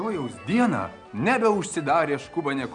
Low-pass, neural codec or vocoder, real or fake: 9.9 kHz; none; real